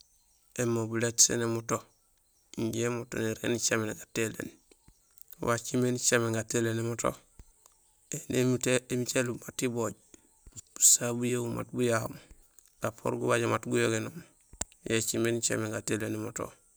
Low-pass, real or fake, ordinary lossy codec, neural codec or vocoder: none; real; none; none